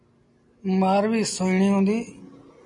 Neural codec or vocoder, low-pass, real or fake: none; 10.8 kHz; real